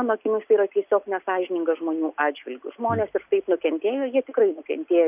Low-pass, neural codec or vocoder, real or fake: 3.6 kHz; none; real